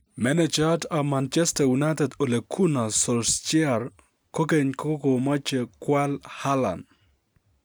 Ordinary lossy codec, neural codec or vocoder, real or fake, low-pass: none; none; real; none